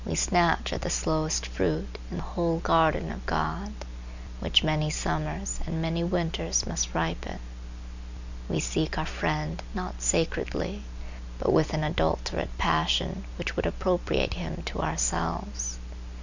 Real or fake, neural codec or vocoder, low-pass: real; none; 7.2 kHz